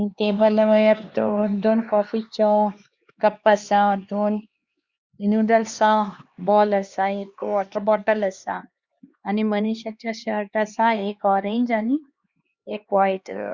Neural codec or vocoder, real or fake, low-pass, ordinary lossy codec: codec, 16 kHz, 2 kbps, X-Codec, HuBERT features, trained on LibriSpeech; fake; 7.2 kHz; Opus, 64 kbps